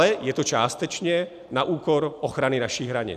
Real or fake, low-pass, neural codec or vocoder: real; 14.4 kHz; none